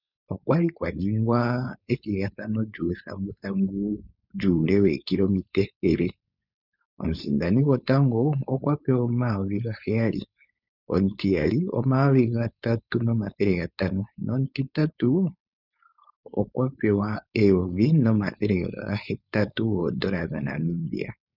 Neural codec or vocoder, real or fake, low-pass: codec, 16 kHz, 4.8 kbps, FACodec; fake; 5.4 kHz